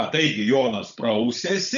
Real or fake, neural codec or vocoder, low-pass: fake; codec, 16 kHz, 16 kbps, FunCodec, trained on Chinese and English, 50 frames a second; 7.2 kHz